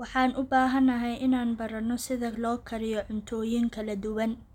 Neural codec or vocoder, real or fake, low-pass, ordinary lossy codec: none; real; 19.8 kHz; none